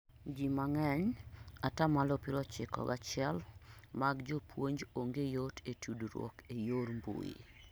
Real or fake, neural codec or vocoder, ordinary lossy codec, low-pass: real; none; none; none